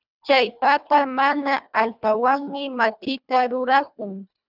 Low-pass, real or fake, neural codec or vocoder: 5.4 kHz; fake; codec, 24 kHz, 1.5 kbps, HILCodec